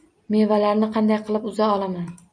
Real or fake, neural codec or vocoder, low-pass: real; none; 9.9 kHz